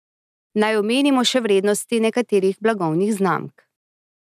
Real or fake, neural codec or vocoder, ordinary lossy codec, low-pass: real; none; none; 14.4 kHz